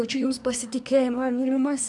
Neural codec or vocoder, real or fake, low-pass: codec, 24 kHz, 1 kbps, SNAC; fake; 10.8 kHz